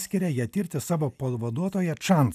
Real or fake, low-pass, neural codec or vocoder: real; 14.4 kHz; none